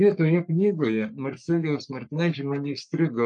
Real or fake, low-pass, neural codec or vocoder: fake; 10.8 kHz; codec, 44.1 kHz, 3.4 kbps, Pupu-Codec